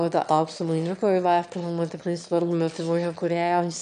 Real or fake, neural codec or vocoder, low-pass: fake; autoencoder, 22.05 kHz, a latent of 192 numbers a frame, VITS, trained on one speaker; 9.9 kHz